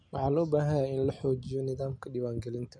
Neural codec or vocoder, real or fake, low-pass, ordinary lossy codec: none; real; 9.9 kHz; none